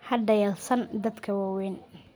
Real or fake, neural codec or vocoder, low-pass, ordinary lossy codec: real; none; none; none